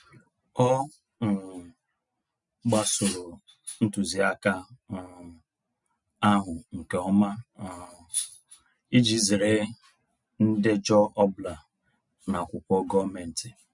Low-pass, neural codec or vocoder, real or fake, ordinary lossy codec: 10.8 kHz; none; real; none